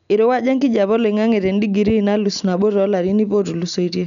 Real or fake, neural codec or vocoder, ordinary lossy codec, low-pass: real; none; none; 7.2 kHz